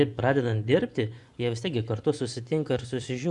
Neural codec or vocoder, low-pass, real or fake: vocoder, 24 kHz, 100 mel bands, Vocos; 10.8 kHz; fake